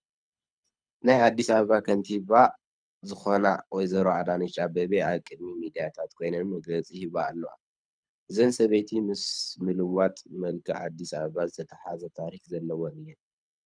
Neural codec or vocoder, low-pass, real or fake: codec, 24 kHz, 6 kbps, HILCodec; 9.9 kHz; fake